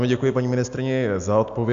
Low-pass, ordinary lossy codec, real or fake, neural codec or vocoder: 7.2 kHz; AAC, 64 kbps; real; none